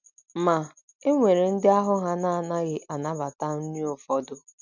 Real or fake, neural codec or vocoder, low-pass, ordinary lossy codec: real; none; 7.2 kHz; none